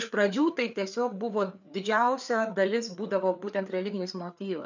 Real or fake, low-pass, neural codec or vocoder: fake; 7.2 kHz; codec, 16 kHz, 4 kbps, FreqCodec, larger model